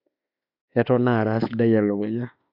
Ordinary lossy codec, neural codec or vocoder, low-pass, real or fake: none; autoencoder, 48 kHz, 32 numbers a frame, DAC-VAE, trained on Japanese speech; 5.4 kHz; fake